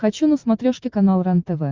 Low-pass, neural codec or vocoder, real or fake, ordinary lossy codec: 7.2 kHz; none; real; Opus, 24 kbps